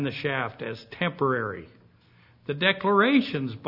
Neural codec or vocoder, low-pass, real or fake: none; 5.4 kHz; real